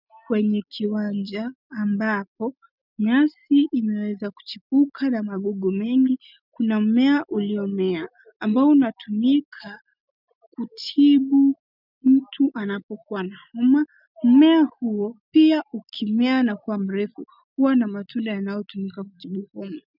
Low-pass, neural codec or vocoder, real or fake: 5.4 kHz; none; real